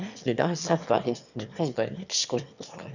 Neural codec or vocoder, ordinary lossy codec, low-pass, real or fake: autoencoder, 22.05 kHz, a latent of 192 numbers a frame, VITS, trained on one speaker; none; 7.2 kHz; fake